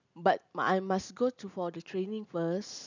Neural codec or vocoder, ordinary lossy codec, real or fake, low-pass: none; none; real; 7.2 kHz